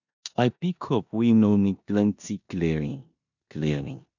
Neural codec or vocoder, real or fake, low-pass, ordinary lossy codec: codec, 16 kHz in and 24 kHz out, 0.9 kbps, LongCat-Audio-Codec, four codebook decoder; fake; 7.2 kHz; none